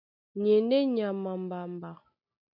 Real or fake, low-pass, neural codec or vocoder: real; 5.4 kHz; none